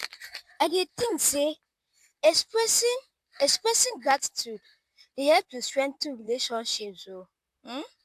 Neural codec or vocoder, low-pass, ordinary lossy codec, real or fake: vocoder, 44.1 kHz, 128 mel bands, Pupu-Vocoder; 14.4 kHz; AAC, 96 kbps; fake